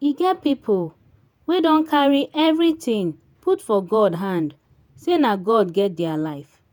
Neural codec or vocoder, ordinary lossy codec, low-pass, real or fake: vocoder, 48 kHz, 128 mel bands, Vocos; none; none; fake